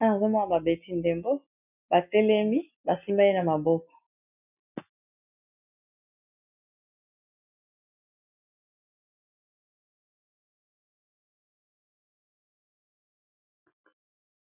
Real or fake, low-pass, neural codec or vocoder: real; 3.6 kHz; none